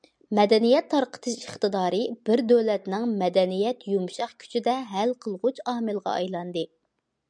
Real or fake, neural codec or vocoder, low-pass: real; none; 9.9 kHz